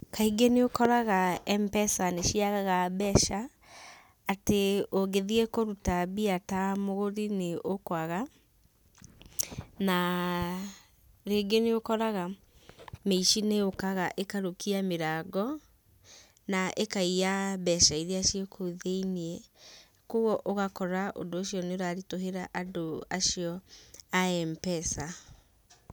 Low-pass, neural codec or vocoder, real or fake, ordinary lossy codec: none; none; real; none